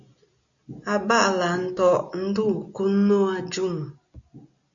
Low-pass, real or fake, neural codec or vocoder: 7.2 kHz; real; none